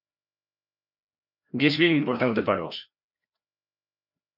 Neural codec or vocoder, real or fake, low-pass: codec, 16 kHz, 1 kbps, FreqCodec, larger model; fake; 5.4 kHz